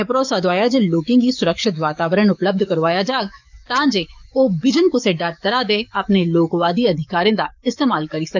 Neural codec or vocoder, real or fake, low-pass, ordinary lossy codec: codec, 44.1 kHz, 7.8 kbps, DAC; fake; 7.2 kHz; none